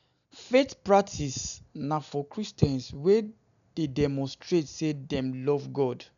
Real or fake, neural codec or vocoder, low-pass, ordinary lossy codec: real; none; 7.2 kHz; none